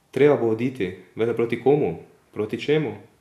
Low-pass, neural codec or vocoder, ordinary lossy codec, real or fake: 14.4 kHz; none; none; real